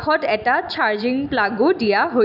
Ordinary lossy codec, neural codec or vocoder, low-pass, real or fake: none; none; 5.4 kHz; real